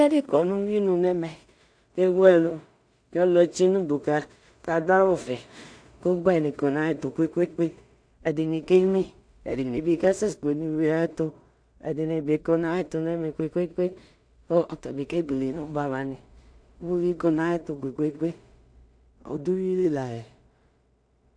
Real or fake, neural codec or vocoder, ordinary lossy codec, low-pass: fake; codec, 16 kHz in and 24 kHz out, 0.4 kbps, LongCat-Audio-Codec, two codebook decoder; AAC, 64 kbps; 9.9 kHz